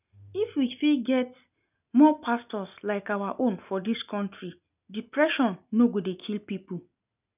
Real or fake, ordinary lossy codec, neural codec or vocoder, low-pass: real; none; none; 3.6 kHz